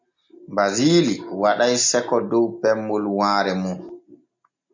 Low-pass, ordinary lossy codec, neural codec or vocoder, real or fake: 7.2 kHz; MP3, 48 kbps; none; real